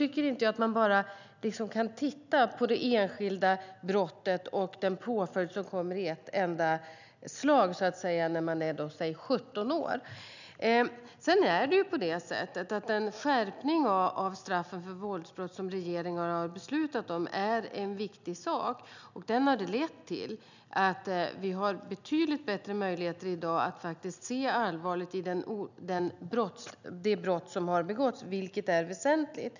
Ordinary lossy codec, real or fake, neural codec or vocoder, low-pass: none; real; none; 7.2 kHz